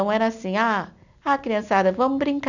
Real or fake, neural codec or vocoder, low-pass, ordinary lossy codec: real; none; 7.2 kHz; none